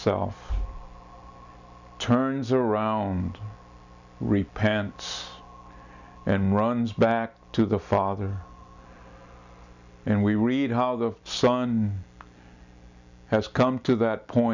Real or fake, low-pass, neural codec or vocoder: real; 7.2 kHz; none